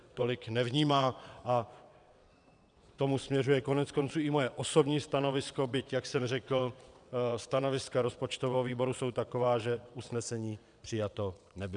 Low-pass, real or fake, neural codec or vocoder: 9.9 kHz; fake; vocoder, 22.05 kHz, 80 mel bands, WaveNeXt